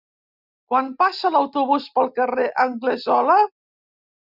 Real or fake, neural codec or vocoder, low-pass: real; none; 5.4 kHz